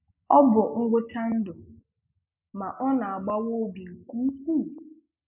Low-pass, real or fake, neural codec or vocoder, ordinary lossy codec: 3.6 kHz; real; none; MP3, 32 kbps